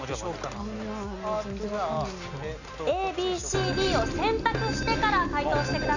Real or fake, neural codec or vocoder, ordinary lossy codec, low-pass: real; none; none; 7.2 kHz